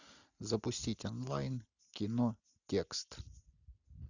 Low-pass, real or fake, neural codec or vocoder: 7.2 kHz; real; none